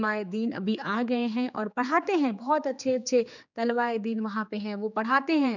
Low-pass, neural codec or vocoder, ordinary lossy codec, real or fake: 7.2 kHz; codec, 16 kHz, 4 kbps, X-Codec, HuBERT features, trained on general audio; none; fake